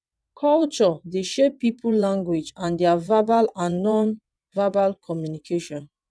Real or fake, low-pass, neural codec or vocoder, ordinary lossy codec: fake; none; vocoder, 22.05 kHz, 80 mel bands, WaveNeXt; none